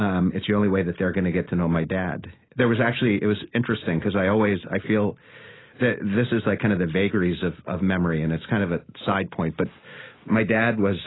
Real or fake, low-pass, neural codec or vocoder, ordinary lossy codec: real; 7.2 kHz; none; AAC, 16 kbps